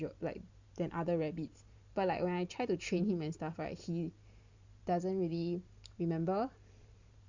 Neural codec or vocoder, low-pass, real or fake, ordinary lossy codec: vocoder, 44.1 kHz, 128 mel bands every 512 samples, BigVGAN v2; 7.2 kHz; fake; Opus, 64 kbps